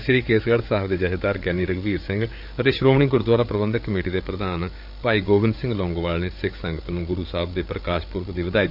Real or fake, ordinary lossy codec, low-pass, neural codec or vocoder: fake; none; 5.4 kHz; codec, 16 kHz, 8 kbps, FreqCodec, larger model